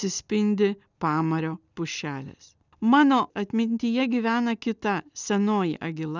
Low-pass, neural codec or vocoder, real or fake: 7.2 kHz; none; real